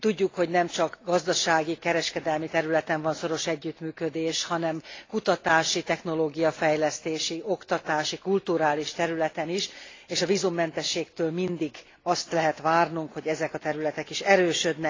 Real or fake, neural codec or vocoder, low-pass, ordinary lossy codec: real; none; 7.2 kHz; AAC, 32 kbps